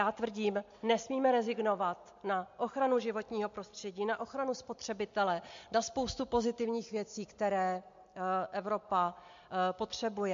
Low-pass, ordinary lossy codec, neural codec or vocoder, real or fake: 7.2 kHz; MP3, 48 kbps; none; real